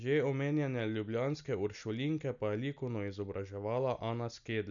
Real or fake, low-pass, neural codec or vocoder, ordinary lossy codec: real; 7.2 kHz; none; none